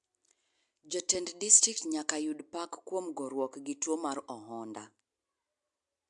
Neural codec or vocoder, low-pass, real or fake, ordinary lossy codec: none; 10.8 kHz; real; MP3, 64 kbps